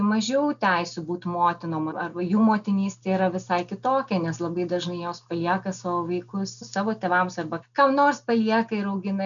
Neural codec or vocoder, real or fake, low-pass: none; real; 7.2 kHz